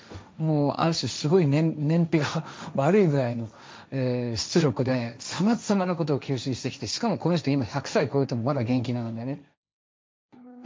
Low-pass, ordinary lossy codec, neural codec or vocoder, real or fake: none; none; codec, 16 kHz, 1.1 kbps, Voila-Tokenizer; fake